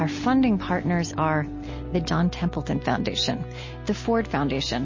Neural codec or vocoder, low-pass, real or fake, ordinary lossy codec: none; 7.2 kHz; real; MP3, 32 kbps